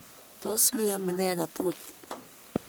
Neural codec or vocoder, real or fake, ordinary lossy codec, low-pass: codec, 44.1 kHz, 1.7 kbps, Pupu-Codec; fake; none; none